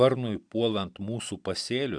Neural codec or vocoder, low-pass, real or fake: none; 9.9 kHz; real